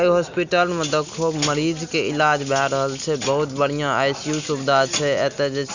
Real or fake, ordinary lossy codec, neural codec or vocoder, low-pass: real; none; none; 7.2 kHz